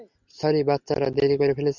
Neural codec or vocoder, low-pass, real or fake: none; 7.2 kHz; real